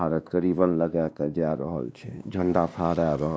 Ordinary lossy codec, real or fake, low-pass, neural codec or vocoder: none; fake; none; codec, 16 kHz, 2 kbps, X-Codec, WavLM features, trained on Multilingual LibriSpeech